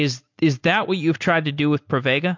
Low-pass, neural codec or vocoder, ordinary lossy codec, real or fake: 7.2 kHz; none; MP3, 48 kbps; real